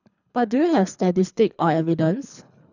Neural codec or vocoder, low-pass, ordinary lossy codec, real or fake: codec, 24 kHz, 3 kbps, HILCodec; 7.2 kHz; none; fake